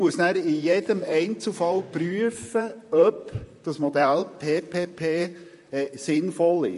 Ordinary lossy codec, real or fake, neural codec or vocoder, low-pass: MP3, 48 kbps; fake; vocoder, 44.1 kHz, 128 mel bands, Pupu-Vocoder; 14.4 kHz